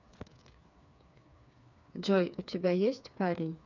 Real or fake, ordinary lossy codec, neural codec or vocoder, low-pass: fake; none; codec, 16 kHz, 4 kbps, FreqCodec, smaller model; 7.2 kHz